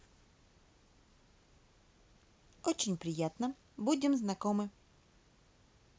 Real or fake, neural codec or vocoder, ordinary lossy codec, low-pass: real; none; none; none